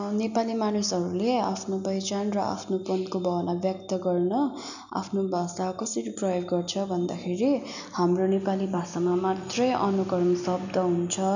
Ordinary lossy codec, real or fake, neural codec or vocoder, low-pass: none; real; none; 7.2 kHz